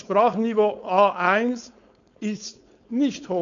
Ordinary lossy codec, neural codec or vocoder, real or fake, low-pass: none; codec, 16 kHz, 4.8 kbps, FACodec; fake; 7.2 kHz